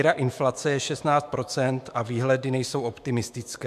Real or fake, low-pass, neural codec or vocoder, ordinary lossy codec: fake; 14.4 kHz; autoencoder, 48 kHz, 128 numbers a frame, DAC-VAE, trained on Japanese speech; MP3, 96 kbps